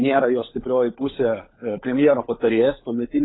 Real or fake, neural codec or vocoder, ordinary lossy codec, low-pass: fake; codec, 16 kHz, 16 kbps, FunCodec, trained on LibriTTS, 50 frames a second; AAC, 16 kbps; 7.2 kHz